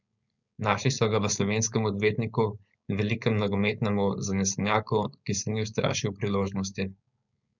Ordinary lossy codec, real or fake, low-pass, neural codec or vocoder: none; fake; 7.2 kHz; codec, 16 kHz, 4.8 kbps, FACodec